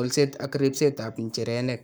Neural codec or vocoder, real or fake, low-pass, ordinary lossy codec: codec, 44.1 kHz, 7.8 kbps, Pupu-Codec; fake; none; none